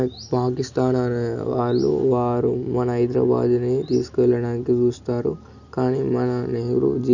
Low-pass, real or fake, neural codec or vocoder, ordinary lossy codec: 7.2 kHz; real; none; none